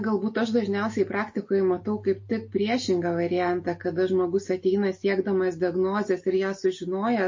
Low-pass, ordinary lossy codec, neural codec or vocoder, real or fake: 7.2 kHz; MP3, 32 kbps; none; real